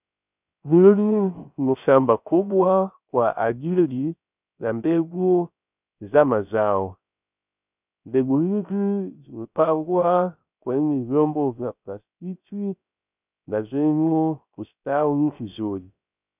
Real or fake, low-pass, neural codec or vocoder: fake; 3.6 kHz; codec, 16 kHz, 0.3 kbps, FocalCodec